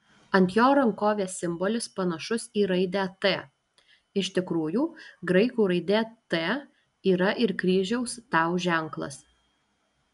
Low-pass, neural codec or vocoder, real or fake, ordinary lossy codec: 10.8 kHz; none; real; MP3, 96 kbps